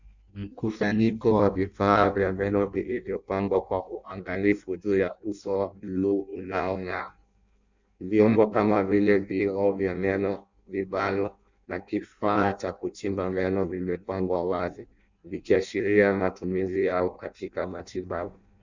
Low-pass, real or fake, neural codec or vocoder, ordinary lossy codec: 7.2 kHz; fake; codec, 16 kHz in and 24 kHz out, 0.6 kbps, FireRedTTS-2 codec; Opus, 64 kbps